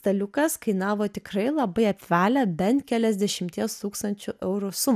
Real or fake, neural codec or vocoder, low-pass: real; none; 14.4 kHz